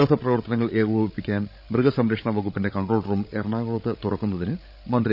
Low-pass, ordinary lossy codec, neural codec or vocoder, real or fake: 5.4 kHz; none; codec, 16 kHz, 16 kbps, FreqCodec, larger model; fake